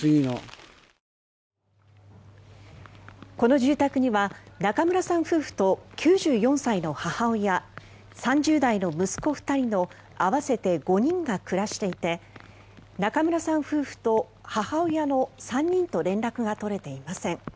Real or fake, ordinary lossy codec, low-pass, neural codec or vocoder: real; none; none; none